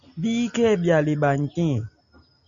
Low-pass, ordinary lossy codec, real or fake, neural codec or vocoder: 7.2 kHz; AAC, 64 kbps; real; none